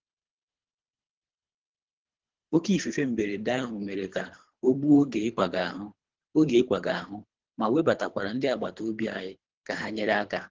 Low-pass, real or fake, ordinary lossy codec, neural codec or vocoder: 7.2 kHz; fake; Opus, 16 kbps; codec, 24 kHz, 3 kbps, HILCodec